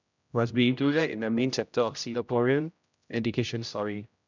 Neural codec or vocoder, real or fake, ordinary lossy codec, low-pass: codec, 16 kHz, 0.5 kbps, X-Codec, HuBERT features, trained on general audio; fake; none; 7.2 kHz